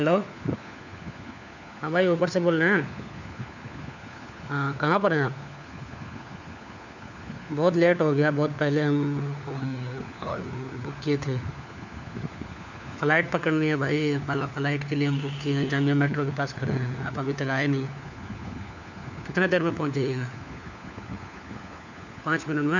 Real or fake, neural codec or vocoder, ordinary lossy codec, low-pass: fake; codec, 16 kHz, 4 kbps, FunCodec, trained on LibriTTS, 50 frames a second; none; 7.2 kHz